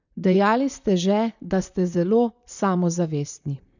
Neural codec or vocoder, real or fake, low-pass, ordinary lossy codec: vocoder, 44.1 kHz, 128 mel bands, Pupu-Vocoder; fake; 7.2 kHz; none